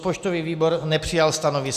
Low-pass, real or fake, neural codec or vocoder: 14.4 kHz; real; none